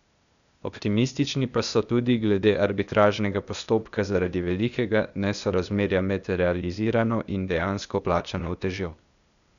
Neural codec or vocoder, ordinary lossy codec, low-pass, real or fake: codec, 16 kHz, 0.8 kbps, ZipCodec; none; 7.2 kHz; fake